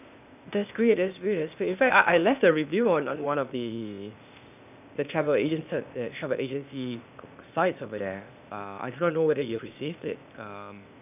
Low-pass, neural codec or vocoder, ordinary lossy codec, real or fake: 3.6 kHz; codec, 16 kHz, 0.8 kbps, ZipCodec; none; fake